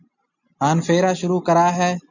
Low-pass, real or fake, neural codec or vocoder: 7.2 kHz; real; none